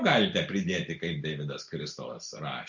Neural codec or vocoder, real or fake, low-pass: none; real; 7.2 kHz